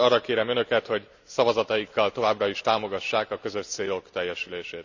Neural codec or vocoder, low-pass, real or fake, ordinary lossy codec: none; 7.2 kHz; real; none